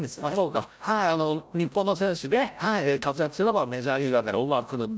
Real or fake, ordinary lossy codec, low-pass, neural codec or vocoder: fake; none; none; codec, 16 kHz, 0.5 kbps, FreqCodec, larger model